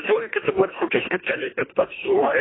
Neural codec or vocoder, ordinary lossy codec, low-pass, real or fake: codec, 24 kHz, 1.5 kbps, HILCodec; AAC, 16 kbps; 7.2 kHz; fake